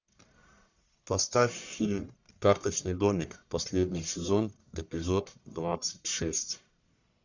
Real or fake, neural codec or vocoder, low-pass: fake; codec, 44.1 kHz, 1.7 kbps, Pupu-Codec; 7.2 kHz